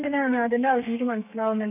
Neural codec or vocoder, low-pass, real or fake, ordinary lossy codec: codec, 32 kHz, 1.9 kbps, SNAC; 3.6 kHz; fake; none